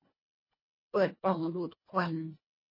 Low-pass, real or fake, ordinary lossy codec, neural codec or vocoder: 5.4 kHz; fake; MP3, 24 kbps; codec, 24 kHz, 1.5 kbps, HILCodec